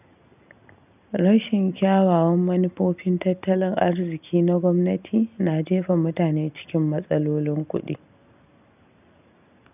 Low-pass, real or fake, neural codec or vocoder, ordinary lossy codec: 3.6 kHz; real; none; none